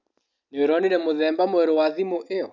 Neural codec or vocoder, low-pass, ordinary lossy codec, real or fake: none; 7.2 kHz; none; real